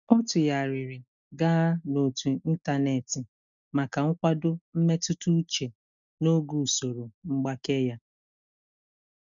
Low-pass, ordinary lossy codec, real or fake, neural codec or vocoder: 7.2 kHz; none; real; none